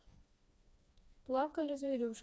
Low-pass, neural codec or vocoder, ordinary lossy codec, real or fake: none; codec, 16 kHz, 2 kbps, FreqCodec, smaller model; none; fake